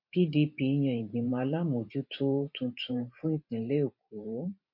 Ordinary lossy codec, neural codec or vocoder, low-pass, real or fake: MP3, 32 kbps; vocoder, 44.1 kHz, 128 mel bands every 512 samples, BigVGAN v2; 5.4 kHz; fake